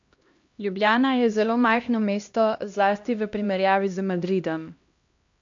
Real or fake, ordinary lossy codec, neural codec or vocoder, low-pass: fake; MP3, 48 kbps; codec, 16 kHz, 1 kbps, X-Codec, HuBERT features, trained on LibriSpeech; 7.2 kHz